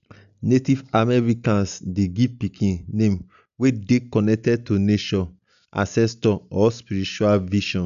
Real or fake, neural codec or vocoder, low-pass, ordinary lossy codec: real; none; 7.2 kHz; none